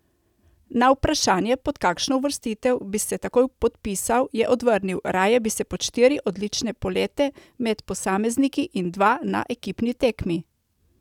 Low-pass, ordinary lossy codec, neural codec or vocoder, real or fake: 19.8 kHz; none; none; real